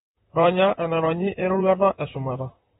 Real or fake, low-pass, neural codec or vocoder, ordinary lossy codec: fake; 19.8 kHz; vocoder, 44.1 kHz, 128 mel bands, Pupu-Vocoder; AAC, 16 kbps